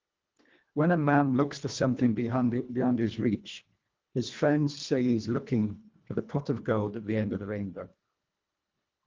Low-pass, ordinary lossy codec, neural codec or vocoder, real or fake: 7.2 kHz; Opus, 32 kbps; codec, 24 kHz, 1.5 kbps, HILCodec; fake